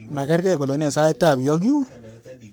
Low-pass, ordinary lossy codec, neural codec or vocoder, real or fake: none; none; codec, 44.1 kHz, 3.4 kbps, Pupu-Codec; fake